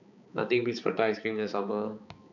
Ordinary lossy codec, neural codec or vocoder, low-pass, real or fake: none; codec, 16 kHz, 4 kbps, X-Codec, HuBERT features, trained on balanced general audio; 7.2 kHz; fake